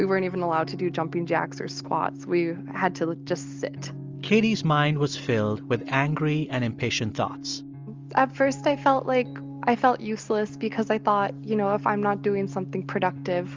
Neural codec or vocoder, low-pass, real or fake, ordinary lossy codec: none; 7.2 kHz; real; Opus, 32 kbps